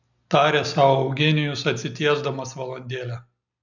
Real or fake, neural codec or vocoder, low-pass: real; none; 7.2 kHz